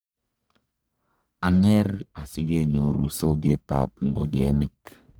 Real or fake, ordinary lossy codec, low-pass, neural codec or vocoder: fake; none; none; codec, 44.1 kHz, 1.7 kbps, Pupu-Codec